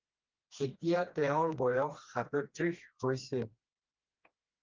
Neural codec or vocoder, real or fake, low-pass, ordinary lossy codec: codec, 16 kHz, 2 kbps, FreqCodec, smaller model; fake; 7.2 kHz; Opus, 24 kbps